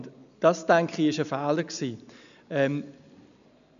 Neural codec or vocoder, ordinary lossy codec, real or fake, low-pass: none; none; real; 7.2 kHz